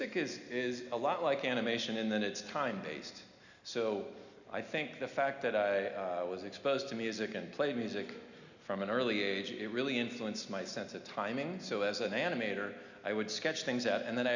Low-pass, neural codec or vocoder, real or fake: 7.2 kHz; none; real